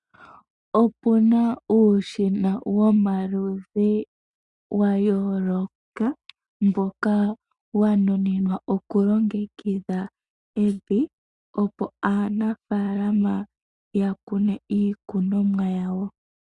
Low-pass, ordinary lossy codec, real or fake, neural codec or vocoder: 10.8 kHz; Opus, 64 kbps; real; none